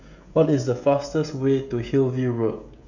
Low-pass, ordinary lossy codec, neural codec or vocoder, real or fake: 7.2 kHz; none; codec, 16 kHz, 16 kbps, FreqCodec, smaller model; fake